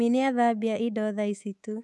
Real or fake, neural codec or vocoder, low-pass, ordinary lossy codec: fake; codec, 24 kHz, 3.1 kbps, DualCodec; none; none